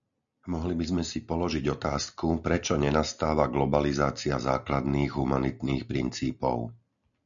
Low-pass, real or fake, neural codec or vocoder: 7.2 kHz; real; none